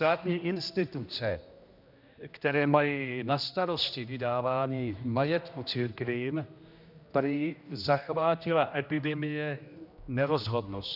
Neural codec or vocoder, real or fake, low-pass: codec, 16 kHz, 1 kbps, X-Codec, HuBERT features, trained on general audio; fake; 5.4 kHz